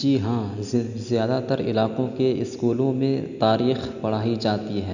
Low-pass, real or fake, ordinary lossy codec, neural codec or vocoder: 7.2 kHz; real; none; none